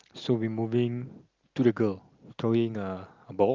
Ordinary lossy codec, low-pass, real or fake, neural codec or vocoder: Opus, 32 kbps; 7.2 kHz; real; none